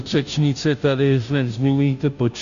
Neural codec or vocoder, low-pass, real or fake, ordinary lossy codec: codec, 16 kHz, 0.5 kbps, FunCodec, trained on Chinese and English, 25 frames a second; 7.2 kHz; fake; MP3, 96 kbps